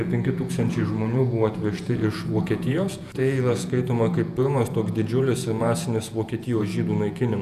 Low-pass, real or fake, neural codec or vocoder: 14.4 kHz; fake; vocoder, 48 kHz, 128 mel bands, Vocos